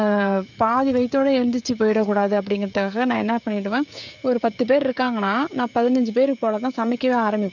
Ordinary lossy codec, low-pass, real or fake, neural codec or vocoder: none; 7.2 kHz; fake; codec, 16 kHz, 16 kbps, FreqCodec, smaller model